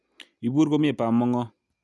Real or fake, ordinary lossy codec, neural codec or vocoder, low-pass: real; none; none; none